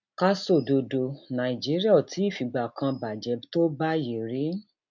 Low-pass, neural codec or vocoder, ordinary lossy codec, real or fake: 7.2 kHz; none; none; real